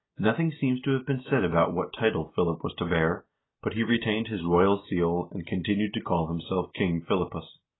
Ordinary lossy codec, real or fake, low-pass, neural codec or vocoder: AAC, 16 kbps; real; 7.2 kHz; none